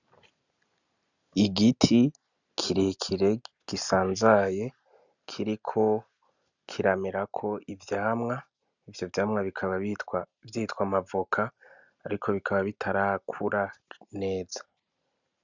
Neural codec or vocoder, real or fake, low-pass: none; real; 7.2 kHz